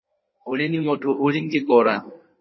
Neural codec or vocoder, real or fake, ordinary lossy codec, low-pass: codec, 16 kHz in and 24 kHz out, 1.1 kbps, FireRedTTS-2 codec; fake; MP3, 24 kbps; 7.2 kHz